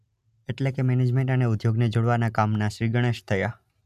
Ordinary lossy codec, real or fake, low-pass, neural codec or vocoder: none; real; 14.4 kHz; none